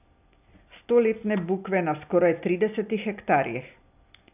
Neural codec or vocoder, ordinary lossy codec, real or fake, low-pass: none; none; real; 3.6 kHz